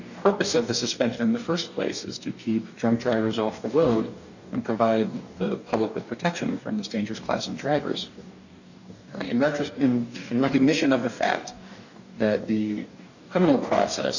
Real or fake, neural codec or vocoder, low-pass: fake; codec, 44.1 kHz, 2.6 kbps, DAC; 7.2 kHz